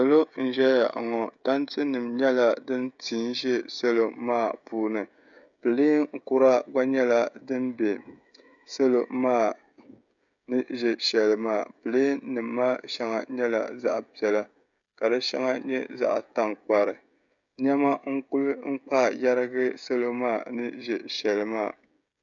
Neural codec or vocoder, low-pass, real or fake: codec, 16 kHz, 16 kbps, FreqCodec, smaller model; 7.2 kHz; fake